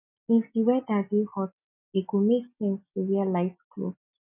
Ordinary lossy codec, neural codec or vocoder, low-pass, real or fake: none; none; 3.6 kHz; real